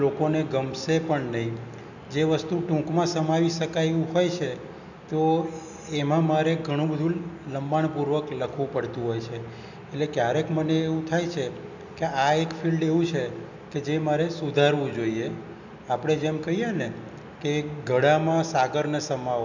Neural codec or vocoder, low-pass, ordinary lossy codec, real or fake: none; 7.2 kHz; none; real